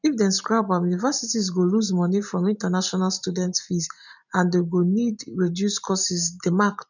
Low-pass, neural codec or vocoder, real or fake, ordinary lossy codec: 7.2 kHz; none; real; none